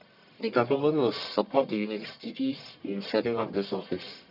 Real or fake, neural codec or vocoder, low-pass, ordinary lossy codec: fake; codec, 44.1 kHz, 1.7 kbps, Pupu-Codec; 5.4 kHz; none